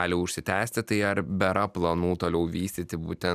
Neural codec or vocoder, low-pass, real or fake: none; 14.4 kHz; real